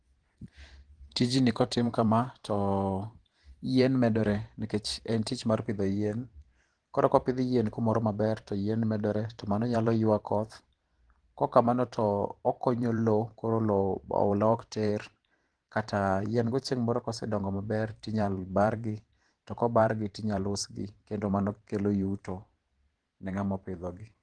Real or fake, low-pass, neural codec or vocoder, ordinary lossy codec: fake; 9.9 kHz; vocoder, 44.1 kHz, 128 mel bands every 512 samples, BigVGAN v2; Opus, 16 kbps